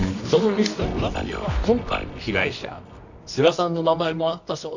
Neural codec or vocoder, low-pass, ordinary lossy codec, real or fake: codec, 24 kHz, 0.9 kbps, WavTokenizer, medium music audio release; 7.2 kHz; none; fake